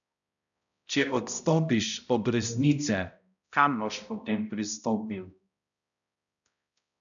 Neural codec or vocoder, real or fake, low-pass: codec, 16 kHz, 0.5 kbps, X-Codec, HuBERT features, trained on balanced general audio; fake; 7.2 kHz